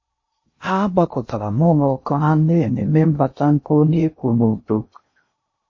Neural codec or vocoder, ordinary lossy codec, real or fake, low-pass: codec, 16 kHz in and 24 kHz out, 0.6 kbps, FocalCodec, streaming, 2048 codes; MP3, 32 kbps; fake; 7.2 kHz